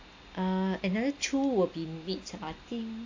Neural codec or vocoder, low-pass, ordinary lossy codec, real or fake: none; 7.2 kHz; AAC, 48 kbps; real